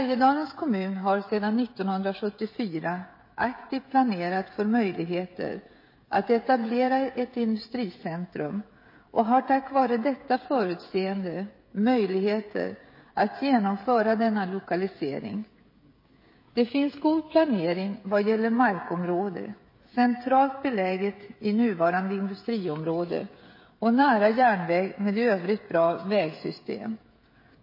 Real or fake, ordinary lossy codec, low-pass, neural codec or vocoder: fake; MP3, 24 kbps; 5.4 kHz; codec, 16 kHz, 16 kbps, FreqCodec, smaller model